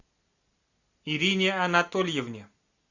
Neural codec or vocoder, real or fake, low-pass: none; real; 7.2 kHz